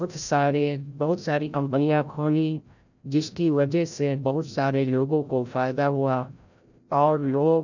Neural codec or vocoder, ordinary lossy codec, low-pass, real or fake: codec, 16 kHz, 0.5 kbps, FreqCodec, larger model; none; 7.2 kHz; fake